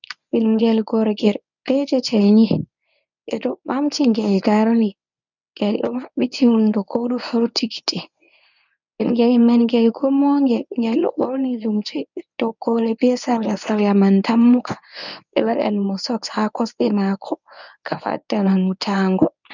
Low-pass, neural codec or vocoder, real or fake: 7.2 kHz; codec, 24 kHz, 0.9 kbps, WavTokenizer, medium speech release version 1; fake